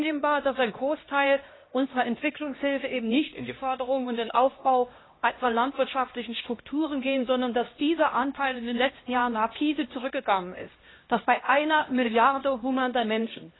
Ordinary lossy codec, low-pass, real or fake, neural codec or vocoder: AAC, 16 kbps; 7.2 kHz; fake; codec, 16 kHz, 1 kbps, X-Codec, HuBERT features, trained on LibriSpeech